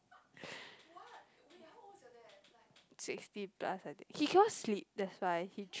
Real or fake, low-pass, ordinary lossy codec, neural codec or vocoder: real; none; none; none